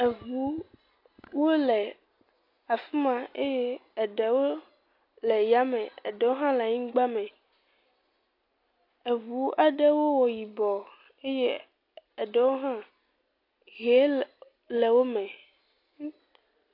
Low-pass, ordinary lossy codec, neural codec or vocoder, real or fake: 5.4 kHz; AAC, 32 kbps; none; real